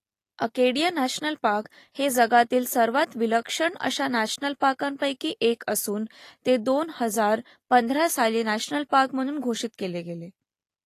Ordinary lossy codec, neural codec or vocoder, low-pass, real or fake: AAC, 48 kbps; none; 14.4 kHz; real